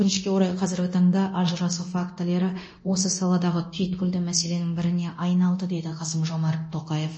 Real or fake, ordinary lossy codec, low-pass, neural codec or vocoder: fake; MP3, 32 kbps; 9.9 kHz; codec, 24 kHz, 0.9 kbps, DualCodec